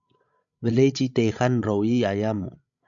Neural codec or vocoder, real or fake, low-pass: codec, 16 kHz, 16 kbps, FreqCodec, larger model; fake; 7.2 kHz